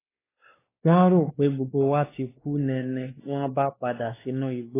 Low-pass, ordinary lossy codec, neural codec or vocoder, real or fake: 3.6 kHz; AAC, 16 kbps; codec, 16 kHz, 2 kbps, X-Codec, WavLM features, trained on Multilingual LibriSpeech; fake